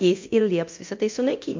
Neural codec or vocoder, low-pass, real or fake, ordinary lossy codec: codec, 24 kHz, 0.9 kbps, DualCodec; 7.2 kHz; fake; MP3, 48 kbps